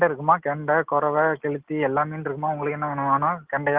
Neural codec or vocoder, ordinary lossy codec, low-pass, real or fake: none; Opus, 16 kbps; 3.6 kHz; real